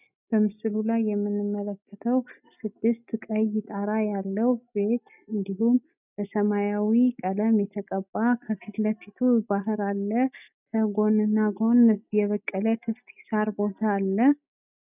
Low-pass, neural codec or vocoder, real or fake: 3.6 kHz; none; real